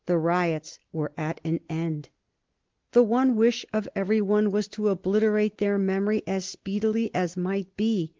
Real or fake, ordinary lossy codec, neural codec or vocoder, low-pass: real; Opus, 16 kbps; none; 7.2 kHz